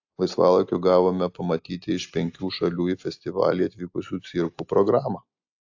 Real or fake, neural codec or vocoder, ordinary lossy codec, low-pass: real; none; AAC, 48 kbps; 7.2 kHz